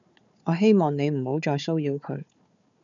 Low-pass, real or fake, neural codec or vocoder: 7.2 kHz; fake; codec, 16 kHz, 4 kbps, FunCodec, trained on Chinese and English, 50 frames a second